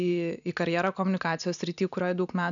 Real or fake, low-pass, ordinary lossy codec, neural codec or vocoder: real; 7.2 kHz; MP3, 96 kbps; none